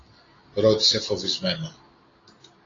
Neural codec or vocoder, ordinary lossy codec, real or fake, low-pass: none; AAC, 32 kbps; real; 7.2 kHz